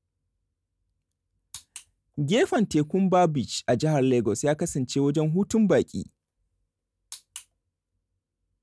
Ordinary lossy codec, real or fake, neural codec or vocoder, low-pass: none; real; none; none